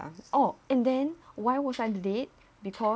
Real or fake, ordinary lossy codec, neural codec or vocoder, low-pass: real; none; none; none